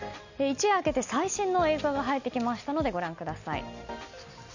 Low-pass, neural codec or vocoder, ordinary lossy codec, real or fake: 7.2 kHz; none; none; real